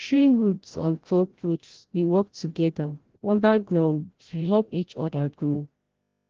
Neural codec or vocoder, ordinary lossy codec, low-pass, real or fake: codec, 16 kHz, 0.5 kbps, FreqCodec, larger model; Opus, 16 kbps; 7.2 kHz; fake